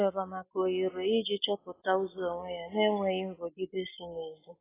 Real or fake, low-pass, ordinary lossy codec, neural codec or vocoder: real; 3.6 kHz; AAC, 16 kbps; none